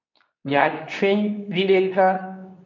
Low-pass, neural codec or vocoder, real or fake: 7.2 kHz; codec, 24 kHz, 0.9 kbps, WavTokenizer, medium speech release version 2; fake